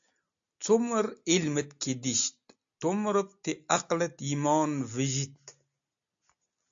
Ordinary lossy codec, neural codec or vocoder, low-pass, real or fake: AAC, 64 kbps; none; 7.2 kHz; real